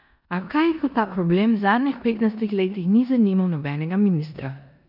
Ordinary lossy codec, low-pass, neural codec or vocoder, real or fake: none; 5.4 kHz; codec, 16 kHz in and 24 kHz out, 0.9 kbps, LongCat-Audio-Codec, four codebook decoder; fake